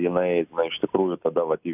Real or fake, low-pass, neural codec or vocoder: real; 3.6 kHz; none